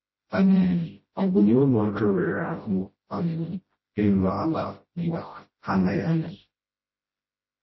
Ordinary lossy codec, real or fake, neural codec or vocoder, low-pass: MP3, 24 kbps; fake; codec, 16 kHz, 0.5 kbps, FreqCodec, smaller model; 7.2 kHz